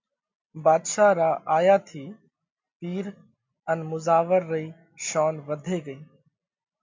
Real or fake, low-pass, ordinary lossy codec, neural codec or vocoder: real; 7.2 kHz; MP3, 48 kbps; none